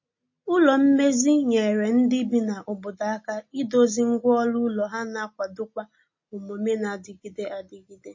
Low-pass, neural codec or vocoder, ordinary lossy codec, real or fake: 7.2 kHz; none; MP3, 32 kbps; real